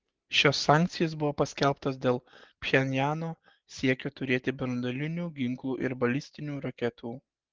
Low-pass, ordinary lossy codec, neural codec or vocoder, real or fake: 7.2 kHz; Opus, 16 kbps; none; real